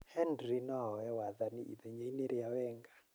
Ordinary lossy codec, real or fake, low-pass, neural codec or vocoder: none; real; none; none